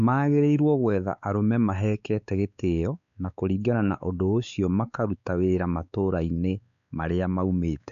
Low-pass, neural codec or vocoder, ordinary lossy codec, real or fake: 7.2 kHz; codec, 16 kHz, 4 kbps, X-Codec, WavLM features, trained on Multilingual LibriSpeech; none; fake